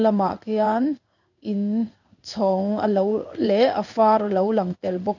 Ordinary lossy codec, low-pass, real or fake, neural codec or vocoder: none; 7.2 kHz; fake; codec, 16 kHz in and 24 kHz out, 1 kbps, XY-Tokenizer